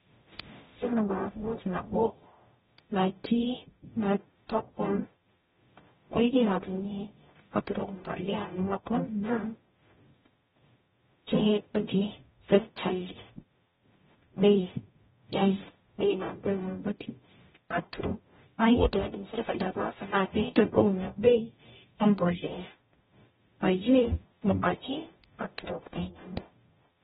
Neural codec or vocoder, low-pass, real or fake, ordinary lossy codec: codec, 44.1 kHz, 0.9 kbps, DAC; 19.8 kHz; fake; AAC, 16 kbps